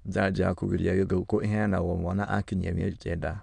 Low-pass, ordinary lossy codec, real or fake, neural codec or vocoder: 9.9 kHz; none; fake; autoencoder, 22.05 kHz, a latent of 192 numbers a frame, VITS, trained on many speakers